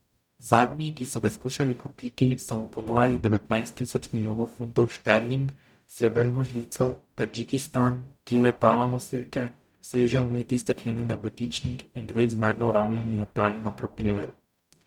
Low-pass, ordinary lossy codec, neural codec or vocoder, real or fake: 19.8 kHz; none; codec, 44.1 kHz, 0.9 kbps, DAC; fake